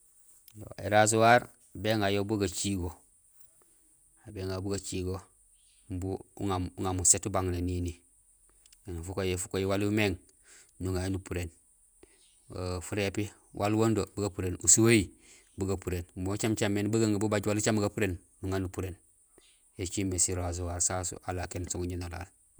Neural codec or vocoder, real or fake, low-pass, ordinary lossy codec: vocoder, 48 kHz, 128 mel bands, Vocos; fake; none; none